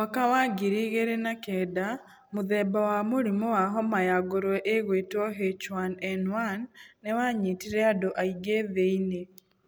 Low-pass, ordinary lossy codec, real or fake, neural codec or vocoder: none; none; real; none